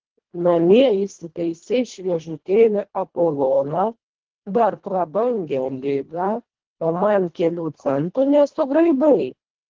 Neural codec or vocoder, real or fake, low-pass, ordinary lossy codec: codec, 24 kHz, 1.5 kbps, HILCodec; fake; 7.2 kHz; Opus, 16 kbps